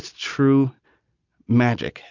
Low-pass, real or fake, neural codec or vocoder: 7.2 kHz; real; none